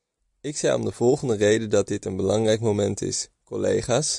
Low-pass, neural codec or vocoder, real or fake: 10.8 kHz; none; real